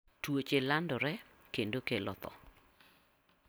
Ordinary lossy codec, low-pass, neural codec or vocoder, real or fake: none; none; none; real